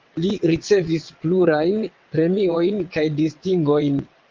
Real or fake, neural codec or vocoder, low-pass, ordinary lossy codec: fake; vocoder, 22.05 kHz, 80 mel bands, WaveNeXt; 7.2 kHz; Opus, 24 kbps